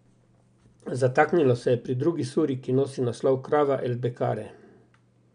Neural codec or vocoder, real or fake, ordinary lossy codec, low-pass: none; real; none; 9.9 kHz